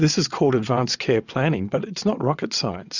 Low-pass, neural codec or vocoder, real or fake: 7.2 kHz; vocoder, 22.05 kHz, 80 mel bands, WaveNeXt; fake